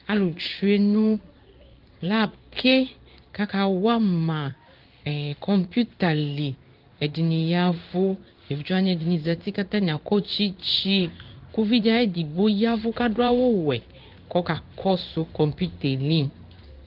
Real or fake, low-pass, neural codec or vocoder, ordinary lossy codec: fake; 5.4 kHz; codec, 16 kHz in and 24 kHz out, 1 kbps, XY-Tokenizer; Opus, 32 kbps